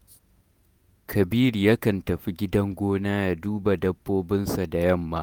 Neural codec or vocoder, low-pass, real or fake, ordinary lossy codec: vocoder, 48 kHz, 128 mel bands, Vocos; none; fake; none